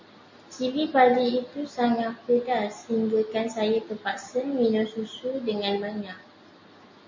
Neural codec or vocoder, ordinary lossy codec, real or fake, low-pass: none; MP3, 32 kbps; real; 7.2 kHz